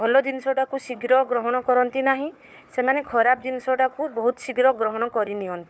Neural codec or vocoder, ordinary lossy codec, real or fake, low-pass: codec, 16 kHz, 4 kbps, FunCodec, trained on Chinese and English, 50 frames a second; none; fake; none